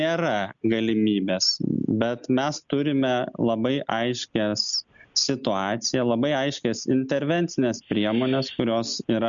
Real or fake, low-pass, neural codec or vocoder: real; 7.2 kHz; none